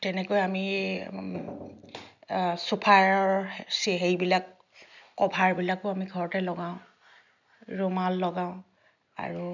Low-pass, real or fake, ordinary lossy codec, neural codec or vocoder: 7.2 kHz; real; none; none